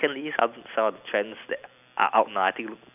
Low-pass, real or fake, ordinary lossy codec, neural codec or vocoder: 3.6 kHz; real; none; none